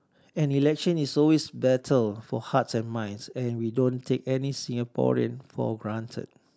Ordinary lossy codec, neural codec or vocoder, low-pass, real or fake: none; none; none; real